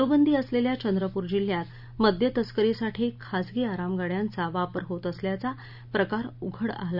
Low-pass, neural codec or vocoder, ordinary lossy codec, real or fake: 5.4 kHz; none; none; real